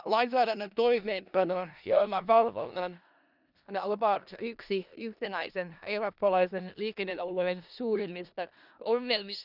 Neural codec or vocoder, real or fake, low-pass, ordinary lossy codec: codec, 16 kHz in and 24 kHz out, 0.4 kbps, LongCat-Audio-Codec, four codebook decoder; fake; 5.4 kHz; none